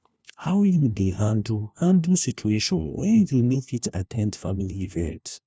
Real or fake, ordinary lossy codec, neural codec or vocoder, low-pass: fake; none; codec, 16 kHz, 1 kbps, FunCodec, trained on LibriTTS, 50 frames a second; none